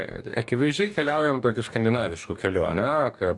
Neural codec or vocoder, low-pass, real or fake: codec, 44.1 kHz, 2.6 kbps, DAC; 10.8 kHz; fake